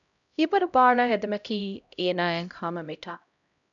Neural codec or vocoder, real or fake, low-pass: codec, 16 kHz, 0.5 kbps, X-Codec, HuBERT features, trained on LibriSpeech; fake; 7.2 kHz